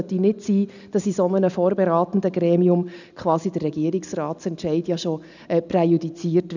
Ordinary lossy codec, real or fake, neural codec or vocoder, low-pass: none; real; none; 7.2 kHz